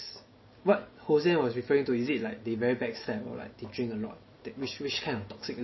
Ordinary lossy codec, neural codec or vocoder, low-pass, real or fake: MP3, 24 kbps; none; 7.2 kHz; real